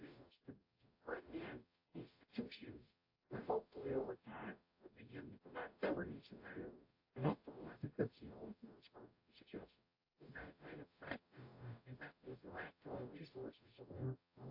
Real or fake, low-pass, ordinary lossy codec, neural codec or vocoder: fake; 5.4 kHz; AAC, 48 kbps; codec, 44.1 kHz, 0.9 kbps, DAC